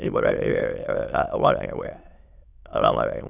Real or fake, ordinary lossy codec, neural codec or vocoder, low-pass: fake; none; autoencoder, 22.05 kHz, a latent of 192 numbers a frame, VITS, trained on many speakers; 3.6 kHz